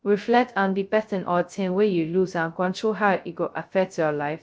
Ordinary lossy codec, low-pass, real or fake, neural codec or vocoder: none; none; fake; codec, 16 kHz, 0.2 kbps, FocalCodec